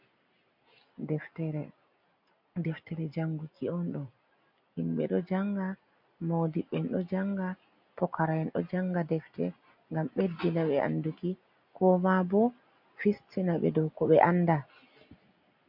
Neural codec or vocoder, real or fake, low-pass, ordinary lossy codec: none; real; 5.4 kHz; MP3, 48 kbps